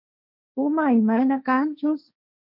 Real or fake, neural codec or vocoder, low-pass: fake; codec, 16 kHz, 1.1 kbps, Voila-Tokenizer; 5.4 kHz